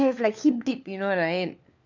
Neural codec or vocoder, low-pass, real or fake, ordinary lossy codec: codec, 16 kHz, 4 kbps, FunCodec, trained on LibriTTS, 50 frames a second; 7.2 kHz; fake; none